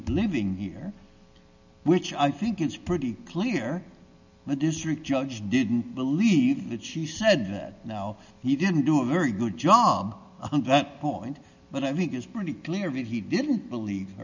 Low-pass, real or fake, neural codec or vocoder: 7.2 kHz; real; none